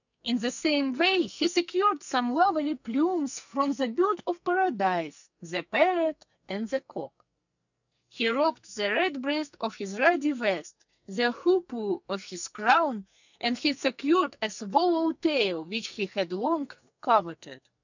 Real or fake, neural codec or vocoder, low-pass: fake; codec, 44.1 kHz, 2.6 kbps, SNAC; 7.2 kHz